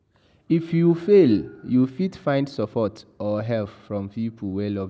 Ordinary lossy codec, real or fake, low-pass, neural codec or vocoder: none; real; none; none